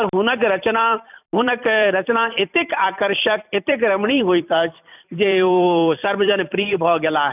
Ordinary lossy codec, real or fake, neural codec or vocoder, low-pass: none; fake; vocoder, 44.1 kHz, 128 mel bands every 256 samples, BigVGAN v2; 3.6 kHz